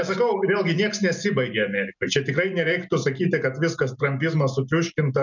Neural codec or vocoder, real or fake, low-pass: none; real; 7.2 kHz